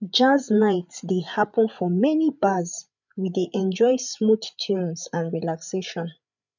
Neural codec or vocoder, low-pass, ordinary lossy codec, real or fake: codec, 16 kHz, 8 kbps, FreqCodec, larger model; 7.2 kHz; none; fake